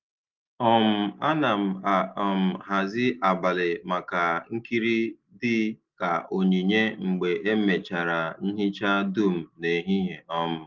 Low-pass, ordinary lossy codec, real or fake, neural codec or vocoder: 7.2 kHz; Opus, 32 kbps; real; none